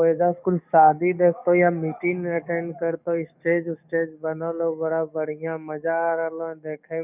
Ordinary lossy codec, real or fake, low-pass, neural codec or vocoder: none; fake; 3.6 kHz; codec, 44.1 kHz, 7.8 kbps, DAC